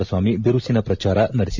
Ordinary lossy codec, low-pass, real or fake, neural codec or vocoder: MP3, 64 kbps; 7.2 kHz; real; none